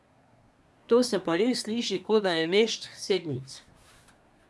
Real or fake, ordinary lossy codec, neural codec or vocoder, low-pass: fake; none; codec, 24 kHz, 1 kbps, SNAC; none